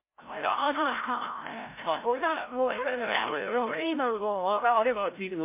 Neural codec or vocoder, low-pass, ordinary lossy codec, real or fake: codec, 16 kHz, 0.5 kbps, FreqCodec, larger model; 3.6 kHz; MP3, 24 kbps; fake